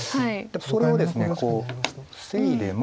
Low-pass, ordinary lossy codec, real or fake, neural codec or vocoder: none; none; real; none